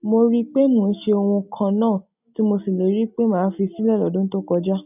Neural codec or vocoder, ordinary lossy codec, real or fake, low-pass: none; none; real; 3.6 kHz